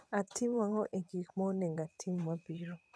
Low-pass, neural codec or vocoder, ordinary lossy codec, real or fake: none; vocoder, 22.05 kHz, 80 mel bands, WaveNeXt; none; fake